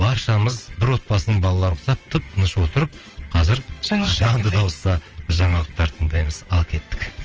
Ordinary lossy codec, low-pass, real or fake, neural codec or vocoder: Opus, 24 kbps; 7.2 kHz; fake; vocoder, 22.05 kHz, 80 mel bands, WaveNeXt